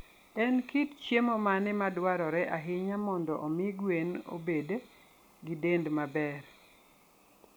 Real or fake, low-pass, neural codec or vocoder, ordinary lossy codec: real; none; none; none